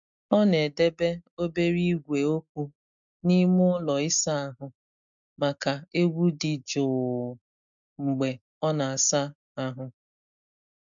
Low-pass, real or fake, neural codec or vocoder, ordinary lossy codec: 7.2 kHz; real; none; MP3, 64 kbps